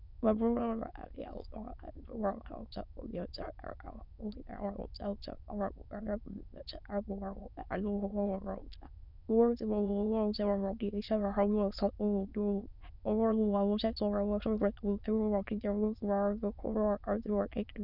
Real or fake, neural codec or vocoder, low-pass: fake; autoencoder, 22.05 kHz, a latent of 192 numbers a frame, VITS, trained on many speakers; 5.4 kHz